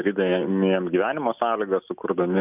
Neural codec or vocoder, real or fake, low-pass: none; real; 3.6 kHz